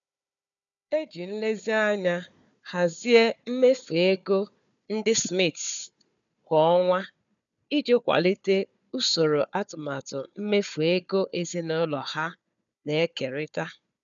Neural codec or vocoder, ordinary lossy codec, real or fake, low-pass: codec, 16 kHz, 4 kbps, FunCodec, trained on Chinese and English, 50 frames a second; none; fake; 7.2 kHz